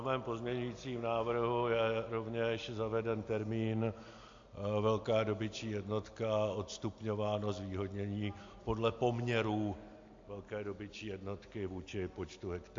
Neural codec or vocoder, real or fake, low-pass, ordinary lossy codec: none; real; 7.2 kHz; AAC, 48 kbps